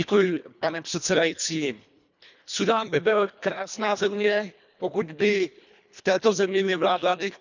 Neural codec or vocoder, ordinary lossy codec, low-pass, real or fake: codec, 24 kHz, 1.5 kbps, HILCodec; none; 7.2 kHz; fake